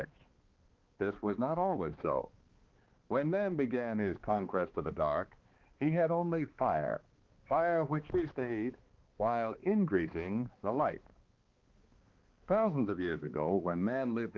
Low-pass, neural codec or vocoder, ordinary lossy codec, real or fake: 7.2 kHz; codec, 16 kHz, 2 kbps, X-Codec, HuBERT features, trained on balanced general audio; Opus, 16 kbps; fake